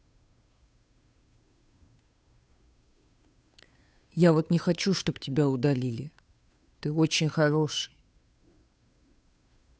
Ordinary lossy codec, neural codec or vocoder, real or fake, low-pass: none; codec, 16 kHz, 2 kbps, FunCodec, trained on Chinese and English, 25 frames a second; fake; none